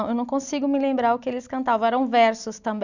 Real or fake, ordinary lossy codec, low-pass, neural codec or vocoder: real; none; 7.2 kHz; none